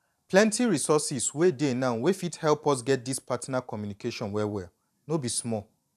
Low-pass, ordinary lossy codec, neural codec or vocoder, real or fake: 14.4 kHz; none; vocoder, 44.1 kHz, 128 mel bands every 512 samples, BigVGAN v2; fake